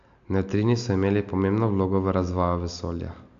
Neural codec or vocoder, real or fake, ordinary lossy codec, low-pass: none; real; AAC, 64 kbps; 7.2 kHz